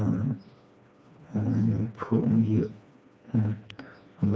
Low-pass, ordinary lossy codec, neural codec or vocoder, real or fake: none; none; codec, 16 kHz, 2 kbps, FreqCodec, smaller model; fake